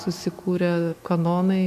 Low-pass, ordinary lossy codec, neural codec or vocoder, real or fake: 14.4 kHz; MP3, 64 kbps; autoencoder, 48 kHz, 128 numbers a frame, DAC-VAE, trained on Japanese speech; fake